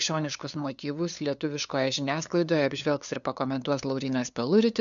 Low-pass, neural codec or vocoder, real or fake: 7.2 kHz; codec, 16 kHz, 2 kbps, FunCodec, trained on LibriTTS, 25 frames a second; fake